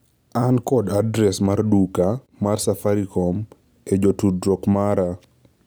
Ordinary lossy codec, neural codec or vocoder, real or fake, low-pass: none; none; real; none